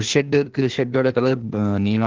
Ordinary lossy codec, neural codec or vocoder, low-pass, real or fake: Opus, 16 kbps; codec, 16 kHz, 0.8 kbps, ZipCodec; 7.2 kHz; fake